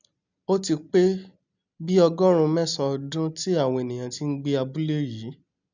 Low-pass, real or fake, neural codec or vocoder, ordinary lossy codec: 7.2 kHz; real; none; none